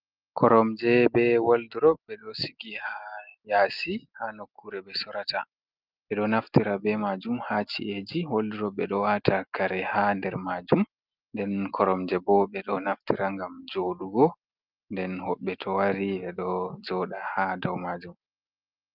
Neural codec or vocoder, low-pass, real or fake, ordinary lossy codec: none; 5.4 kHz; real; Opus, 32 kbps